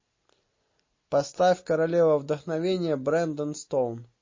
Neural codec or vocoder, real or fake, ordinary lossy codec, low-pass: none; real; MP3, 32 kbps; 7.2 kHz